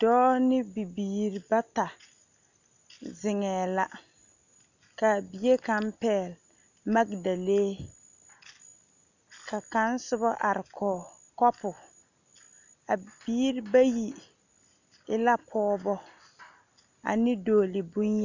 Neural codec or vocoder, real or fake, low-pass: none; real; 7.2 kHz